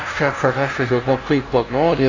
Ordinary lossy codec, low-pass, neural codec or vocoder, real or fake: AAC, 32 kbps; 7.2 kHz; codec, 16 kHz, 0.5 kbps, FunCodec, trained on LibriTTS, 25 frames a second; fake